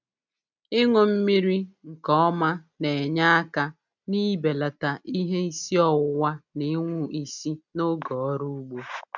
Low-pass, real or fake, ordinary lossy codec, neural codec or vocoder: 7.2 kHz; real; none; none